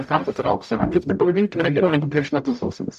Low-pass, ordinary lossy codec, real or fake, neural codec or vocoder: 14.4 kHz; AAC, 96 kbps; fake; codec, 44.1 kHz, 0.9 kbps, DAC